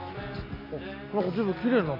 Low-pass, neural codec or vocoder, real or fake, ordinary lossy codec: 5.4 kHz; none; real; AAC, 24 kbps